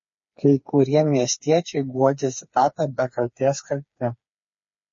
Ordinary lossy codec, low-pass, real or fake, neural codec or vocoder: MP3, 32 kbps; 7.2 kHz; fake; codec, 16 kHz, 4 kbps, FreqCodec, smaller model